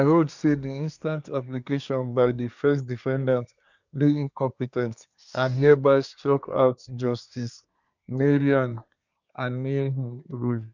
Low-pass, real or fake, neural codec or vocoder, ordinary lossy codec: 7.2 kHz; fake; codec, 24 kHz, 1 kbps, SNAC; none